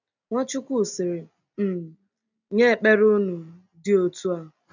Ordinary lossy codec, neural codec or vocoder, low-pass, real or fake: none; none; 7.2 kHz; real